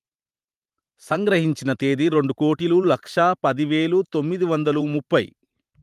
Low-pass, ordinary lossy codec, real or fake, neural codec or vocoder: 14.4 kHz; Opus, 32 kbps; fake; vocoder, 44.1 kHz, 128 mel bands every 512 samples, BigVGAN v2